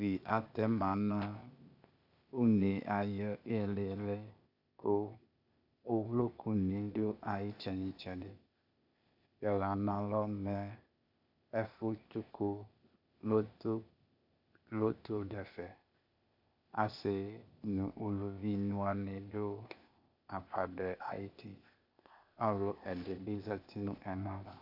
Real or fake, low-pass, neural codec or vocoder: fake; 5.4 kHz; codec, 16 kHz, 0.8 kbps, ZipCodec